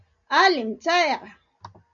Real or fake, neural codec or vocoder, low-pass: real; none; 7.2 kHz